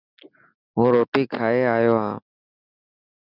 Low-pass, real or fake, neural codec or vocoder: 5.4 kHz; real; none